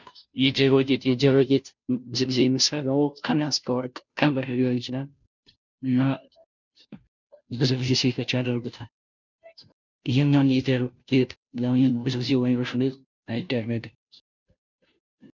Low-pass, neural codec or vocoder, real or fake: 7.2 kHz; codec, 16 kHz, 0.5 kbps, FunCodec, trained on Chinese and English, 25 frames a second; fake